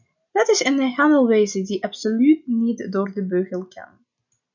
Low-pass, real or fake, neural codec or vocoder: 7.2 kHz; real; none